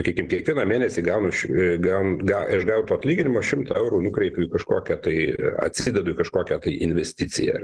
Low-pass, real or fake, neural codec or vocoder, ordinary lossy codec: 10.8 kHz; real; none; Opus, 16 kbps